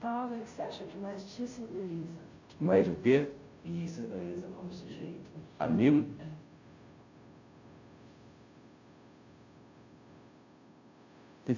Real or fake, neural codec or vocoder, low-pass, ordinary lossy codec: fake; codec, 16 kHz, 0.5 kbps, FunCodec, trained on Chinese and English, 25 frames a second; 7.2 kHz; AAC, 48 kbps